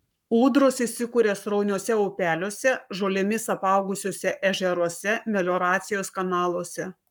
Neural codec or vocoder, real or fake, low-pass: codec, 44.1 kHz, 7.8 kbps, Pupu-Codec; fake; 19.8 kHz